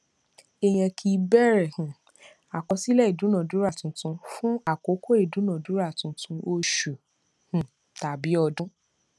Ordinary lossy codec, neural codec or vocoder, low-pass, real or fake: none; none; none; real